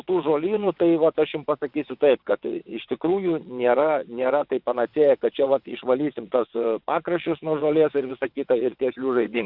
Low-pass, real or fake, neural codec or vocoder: 5.4 kHz; fake; vocoder, 22.05 kHz, 80 mel bands, WaveNeXt